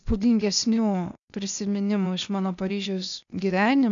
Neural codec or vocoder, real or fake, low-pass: codec, 16 kHz, 0.8 kbps, ZipCodec; fake; 7.2 kHz